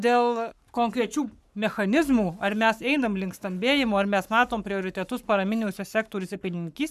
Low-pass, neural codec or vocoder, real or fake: 14.4 kHz; codec, 44.1 kHz, 7.8 kbps, Pupu-Codec; fake